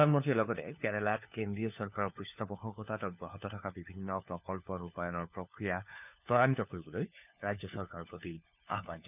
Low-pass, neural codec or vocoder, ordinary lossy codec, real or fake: 3.6 kHz; codec, 16 kHz, 4 kbps, FunCodec, trained on LibriTTS, 50 frames a second; none; fake